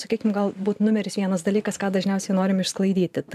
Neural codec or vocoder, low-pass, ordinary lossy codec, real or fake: vocoder, 44.1 kHz, 128 mel bands every 512 samples, BigVGAN v2; 14.4 kHz; AAC, 96 kbps; fake